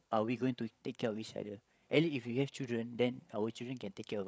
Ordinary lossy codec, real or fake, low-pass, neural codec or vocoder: none; fake; none; codec, 16 kHz, 8 kbps, FreqCodec, larger model